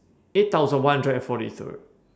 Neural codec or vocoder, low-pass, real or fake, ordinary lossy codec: none; none; real; none